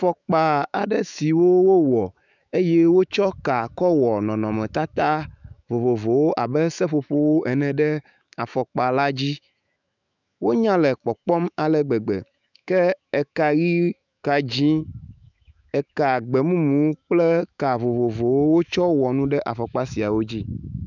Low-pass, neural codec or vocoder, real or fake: 7.2 kHz; autoencoder, 48 kHz, 128 numbers a frame, DAC-VAE, trained on Japanese speech; fake